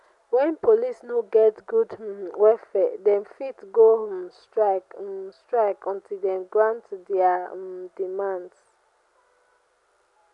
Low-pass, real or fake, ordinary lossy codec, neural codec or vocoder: 10.8 kHz; real; none; none